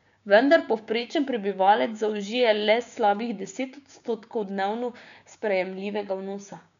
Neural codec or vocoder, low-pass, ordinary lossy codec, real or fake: codec, 16 kHz, 6 kbps, DAC; 7.2 kHz; none; fake